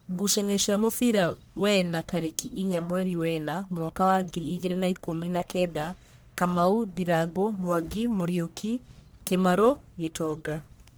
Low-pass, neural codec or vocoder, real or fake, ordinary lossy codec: none; codec, 44.1 kHz, 1.7 kbps, Pupu-Codec; fake; none